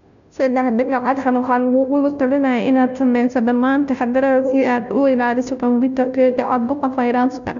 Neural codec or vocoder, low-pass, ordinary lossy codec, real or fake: codec, 16 kHz, 0.5 kbps, FunCodec, trained on Chinese and English, 25 frames a second; 7.2 kHz; none; fake